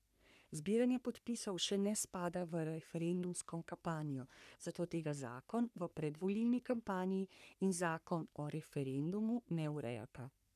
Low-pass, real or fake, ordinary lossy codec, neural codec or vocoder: 14.4 kHz; fake; none; codec, 44.1 kHz, 3.4 kbps, Pupu-Codec